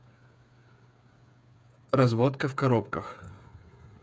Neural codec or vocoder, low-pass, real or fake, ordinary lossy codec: codec, 16 kHz, 8 kbps, FreqCodec, smaller model; none; fake; none